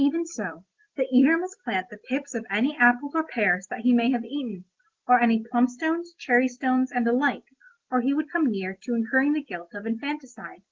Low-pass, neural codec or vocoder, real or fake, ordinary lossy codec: 7.2 kHz; none; real; Opus, 16 kbps